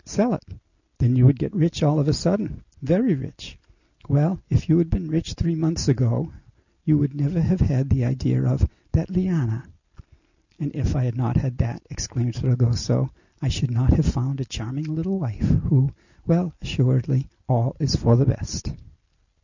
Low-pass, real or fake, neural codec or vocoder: 7.2 kHz; real; none